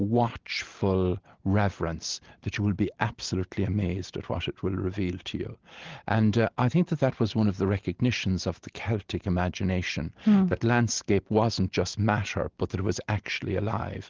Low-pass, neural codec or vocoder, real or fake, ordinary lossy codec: 7.2 kHz; none; real; Opus, 16 kbps